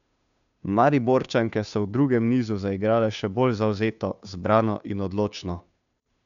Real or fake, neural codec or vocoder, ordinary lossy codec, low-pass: fake; codec, 16 kHz, 2 kbps, FunCodec, trained on Chinese and English, 25 frames a second; MP3, 96 kbps; 7.2 kHz